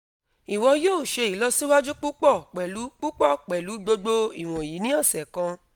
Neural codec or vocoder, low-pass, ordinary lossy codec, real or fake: none; none; none; real